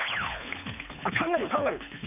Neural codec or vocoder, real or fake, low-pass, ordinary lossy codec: codec, 24 kHz, 3 kbps, HILCodec; fake; 3.6 kHz; none